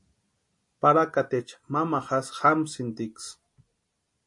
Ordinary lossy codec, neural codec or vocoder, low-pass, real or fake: MP3, 48 kbps; none; 10.8 kHz; real